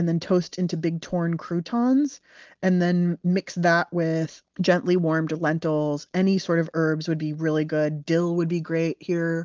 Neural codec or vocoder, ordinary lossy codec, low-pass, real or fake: none; Opus, 24 kbps; 7.2 kHz; real